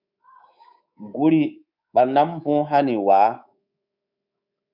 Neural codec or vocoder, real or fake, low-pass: codec, 24 kHz, 3.1 kbps, DualCodec; fake; 5.4 kHz